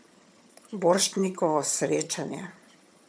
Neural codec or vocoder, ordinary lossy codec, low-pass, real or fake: vocoder, 22.05 kHz, 80 mel bands, HiFi-GAN; none; none; fake